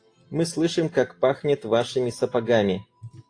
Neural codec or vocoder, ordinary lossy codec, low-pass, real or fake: none; AAC, 48 kbps; 9.9 kHz; real